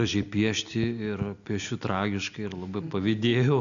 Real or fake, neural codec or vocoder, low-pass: real; none; 7.2 kHz